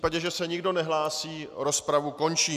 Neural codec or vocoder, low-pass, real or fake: none; 14.4 kHz; real